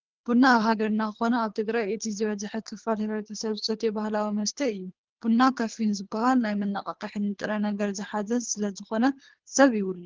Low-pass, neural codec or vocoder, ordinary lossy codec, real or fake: 7.2 kHz; codec, 24 kHz, 3 kbps, HILCodec; Opus, 16 kbps; fake